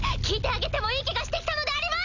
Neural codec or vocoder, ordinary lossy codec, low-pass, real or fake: none; none; 7.2 kHz; real